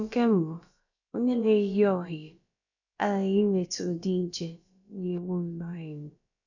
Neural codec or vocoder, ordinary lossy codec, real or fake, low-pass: codec, 16 kHz, about 1 kbps, DyCAST, with the encoder's durations; none; fake; 7.2 kHz